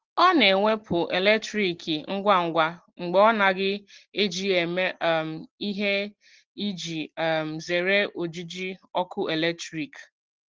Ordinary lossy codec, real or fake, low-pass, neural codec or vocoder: Opus, 16 kbps; real; 7.2 kHz; none